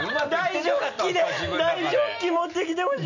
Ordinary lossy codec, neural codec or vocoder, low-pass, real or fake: none; none; 7.2 kHz; real